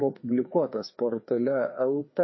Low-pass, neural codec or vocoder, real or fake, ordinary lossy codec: 7.2 kHz; codec, 16 kHz, 2 kbps, FreqCodec, larger model; fake; MP3, 24 kbps